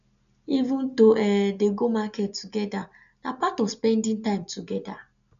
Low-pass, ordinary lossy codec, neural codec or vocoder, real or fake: 7.2 kHz; MP3, 96 kbps; none; real